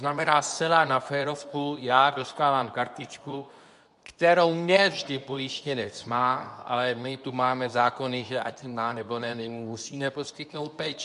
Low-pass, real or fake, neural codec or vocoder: 10.8 kHz; fake; codec, 24 kHz, 0.9 kbps, WavTokenizer, medium speech release version 2